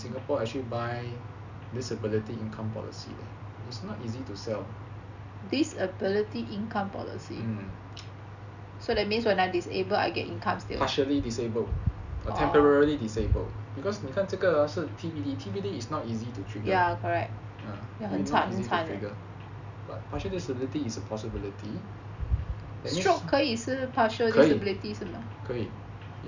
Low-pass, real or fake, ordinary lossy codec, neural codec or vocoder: 7.2 kHz; real; none; none